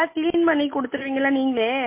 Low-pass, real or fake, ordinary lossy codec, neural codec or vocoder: 3.6 kHz; real; MP3, 24 kbps; none